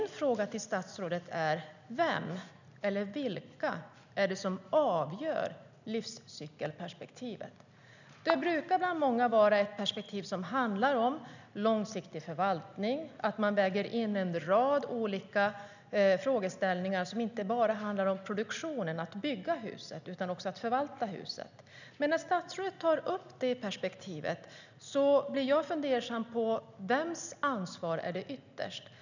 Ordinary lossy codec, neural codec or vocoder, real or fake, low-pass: none; none; real; 7.2 kHz